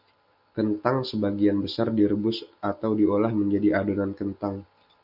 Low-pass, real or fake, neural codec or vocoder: 5.4 kHz; real; none